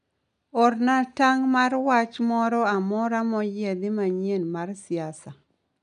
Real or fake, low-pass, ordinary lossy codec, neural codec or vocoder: real; 10.8 kHz; MP3, 96 kbps; none